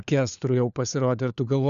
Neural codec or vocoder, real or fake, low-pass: codec, 16 kHz, 4 kbps, FunCodec, trained on LibriTTS, 50 frames a second; fake; 7.2 kHz